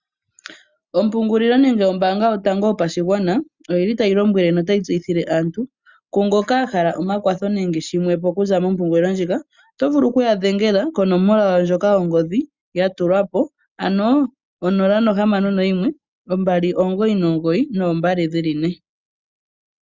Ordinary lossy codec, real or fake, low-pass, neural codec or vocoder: Opus, 64 kbps; real; 7.2 kHz; none